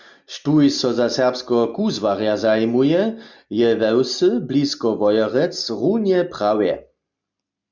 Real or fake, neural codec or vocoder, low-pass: real; none; 7.2 kHz